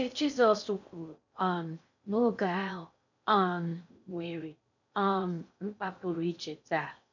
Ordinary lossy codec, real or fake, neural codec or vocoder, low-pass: none; fake; codec, 16 kHz in and 24 kHz out, 0.6 kbps, FocalCodec, streaming, 4096 codes; 7.2 kHz